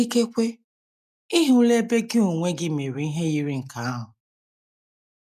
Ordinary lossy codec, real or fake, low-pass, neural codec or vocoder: none; real; 14.4 kHz; none